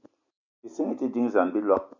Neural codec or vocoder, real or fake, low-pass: none; real; 7.2 kHz